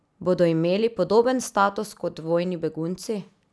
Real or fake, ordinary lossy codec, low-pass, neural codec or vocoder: real; none; none; none